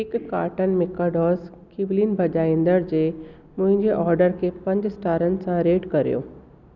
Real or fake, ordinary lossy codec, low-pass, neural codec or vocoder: real; none; 7.2 kHz; none